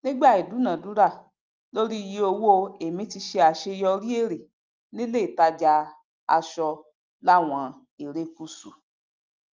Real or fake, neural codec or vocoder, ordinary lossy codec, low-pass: real; none; Opus, 24 kbps; 7.2 kHz